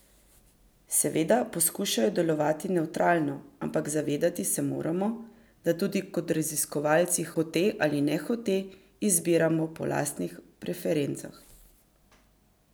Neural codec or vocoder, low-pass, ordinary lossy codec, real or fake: none; none; none; real